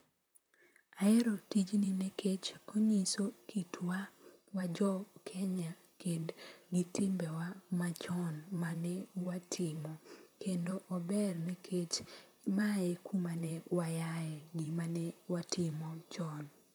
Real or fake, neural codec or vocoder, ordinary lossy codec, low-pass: fake; vocoder, 44.1 kHz, 128 mel bands, Pupu-Vocoder; none; none